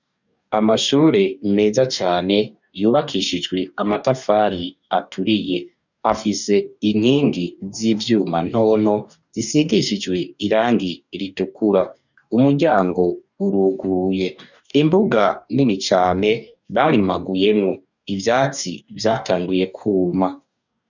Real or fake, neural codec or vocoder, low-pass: fake; codec, 44.1 kHz, 2.6 kbps, DAC; 7.2 kHz